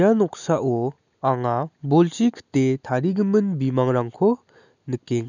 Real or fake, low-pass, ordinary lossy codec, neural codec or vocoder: real; 7.2 kHz; none; none